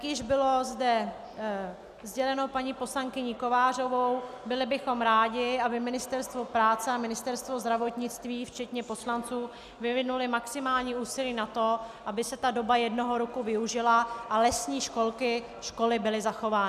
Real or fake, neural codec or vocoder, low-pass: real; none; 14.4 kHz